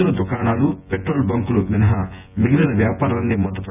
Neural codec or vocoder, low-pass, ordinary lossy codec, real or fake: vocoder, 24 kHz, 100 mel bands, Vocos; 3.6 kHz; none; fake